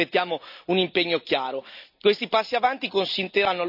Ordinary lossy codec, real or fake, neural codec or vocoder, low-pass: none; real; none; 5.4 kHz